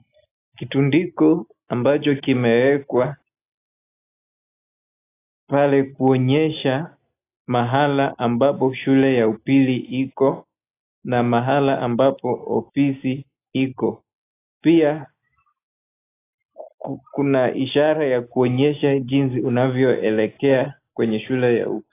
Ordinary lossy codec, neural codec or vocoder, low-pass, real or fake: AAC, 24 kbps; none; 3.6 kHz; real